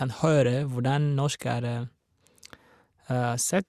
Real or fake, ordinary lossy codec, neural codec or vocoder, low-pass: real; Opus, 64 kbps; none; 14.4 kHz